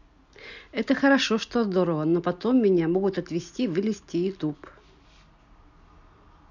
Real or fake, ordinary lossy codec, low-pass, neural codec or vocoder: real; none; 7.2 kHz; none